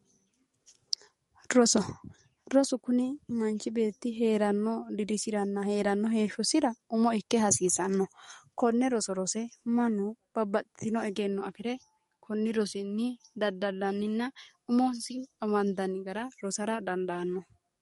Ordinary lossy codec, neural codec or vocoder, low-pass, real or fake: MP3, 48 kbps; codec, 44.1 kHz, 7.8 kbps, DAC; 19.8 kHz; fake